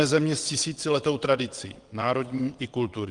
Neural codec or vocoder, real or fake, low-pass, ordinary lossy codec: vocoder, 22.05 kHz, 80 mel bands, WaveNeXt; fake; 9.9 kHz; Opus, 24 kbps